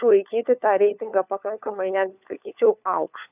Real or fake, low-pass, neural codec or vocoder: fake; 3.6 kHz; codec, 16 kHz, 4 kbps, FunCodec, trained on LibriTTS, 50 frames a second